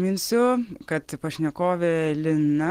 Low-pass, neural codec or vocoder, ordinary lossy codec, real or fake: 14.4 kHz; none; Opus, 16 kbps; real